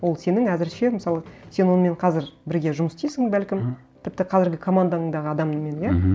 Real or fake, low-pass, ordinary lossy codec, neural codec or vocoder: real; none; none; none